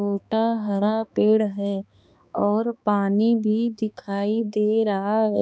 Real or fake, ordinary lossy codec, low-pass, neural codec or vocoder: fake; none; none; codec, 16 kHz, 2 kbps, X-Codec, HuBERT features, trained on balanced general audio